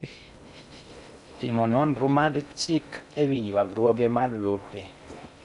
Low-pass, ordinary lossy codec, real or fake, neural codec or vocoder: 10.8 kHz; none; fake; codec, 16 kHz in and 24 kHz out, 0.6 kbps, FocalCodec, streaming, 2048 codes